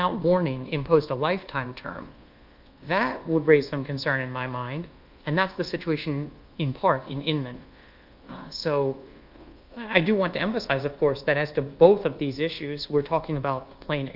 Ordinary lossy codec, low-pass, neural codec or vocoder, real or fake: Opus, 32 kbps; 5.4 kHz; codec, 24 kHz, 1.2 kbps, DualCodec; fake